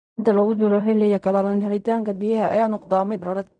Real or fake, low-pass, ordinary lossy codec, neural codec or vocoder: fake; 9.9 kHz; none; codec, 16 kHz in and 24 kHz out, 0.4 kbps, LongCat-Audio-Codec, fine tuned four codebook decoder